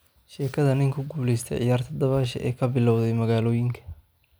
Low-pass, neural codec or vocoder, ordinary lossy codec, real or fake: none; none; none; real